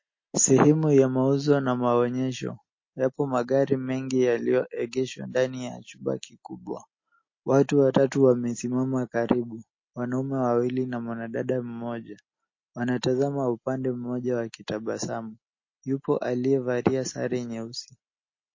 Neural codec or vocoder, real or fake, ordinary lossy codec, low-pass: none; real; MP3, 32 kbps; 7.2 kHz